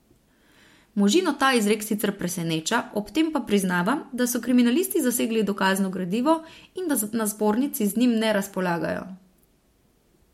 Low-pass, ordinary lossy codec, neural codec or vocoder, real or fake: 19.8 kHz; MP3, 64 kbps; none; real